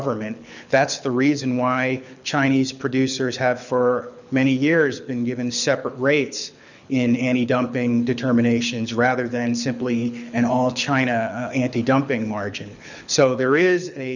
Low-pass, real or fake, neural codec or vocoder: 7.2 kHz; fake; codec, 24 kHz, 6 kbps, HILCodec